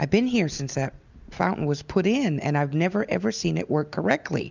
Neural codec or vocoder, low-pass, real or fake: none; 7.2 kHz; real